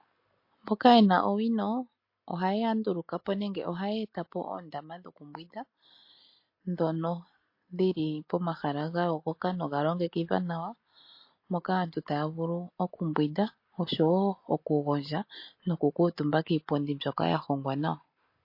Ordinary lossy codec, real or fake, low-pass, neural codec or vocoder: MP3, 32 kbps; real; 5.4 kHz; none